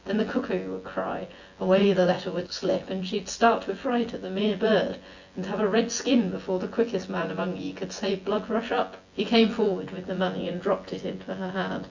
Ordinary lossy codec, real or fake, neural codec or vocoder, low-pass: Opus, 64 kbps; fake; vocoder, 24 kHz, 100 mel bands, Vocos; 7.2 kHz